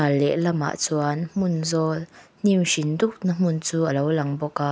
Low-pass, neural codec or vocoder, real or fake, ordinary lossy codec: none; none; real; none